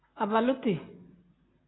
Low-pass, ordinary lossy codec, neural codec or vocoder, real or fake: 7.2 kHz; AAC, 16 kbps; none; real